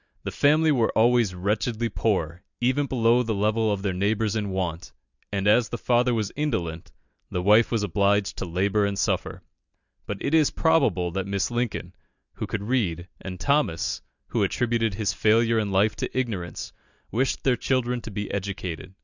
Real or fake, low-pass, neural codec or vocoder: real; 7.2 kHz; none